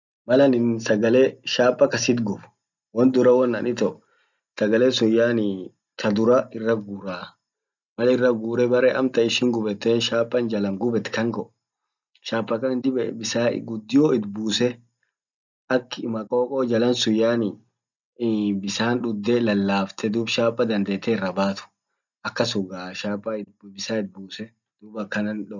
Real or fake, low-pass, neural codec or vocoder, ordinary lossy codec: real; 7.2 kHz; none; none